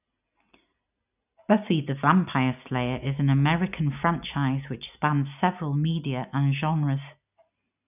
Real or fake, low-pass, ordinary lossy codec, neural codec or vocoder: real; 3.6 kHz; none; none